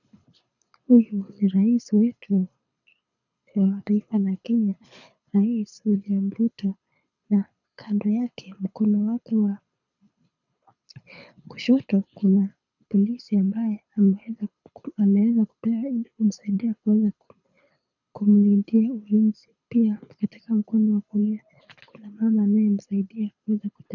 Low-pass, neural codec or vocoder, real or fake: 7.2 kHz; codec, 24 kHz, 6 kbps, HILCodec; fake